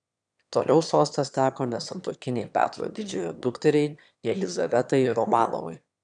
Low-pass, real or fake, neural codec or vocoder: 9.9 kHz; fake; autoencoder, 22.05 kHz, a latent of 192 numbers a frame, VITS, trained on one speaker